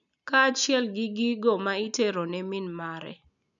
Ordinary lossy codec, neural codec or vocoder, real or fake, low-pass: none; none; real; 7.2 kHz